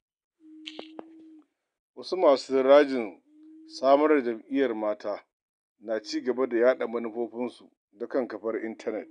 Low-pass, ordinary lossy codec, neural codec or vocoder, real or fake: 9.9 kHz; none; none; real